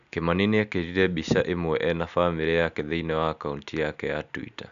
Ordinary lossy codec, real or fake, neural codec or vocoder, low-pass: none; real; none; 7.2 kHz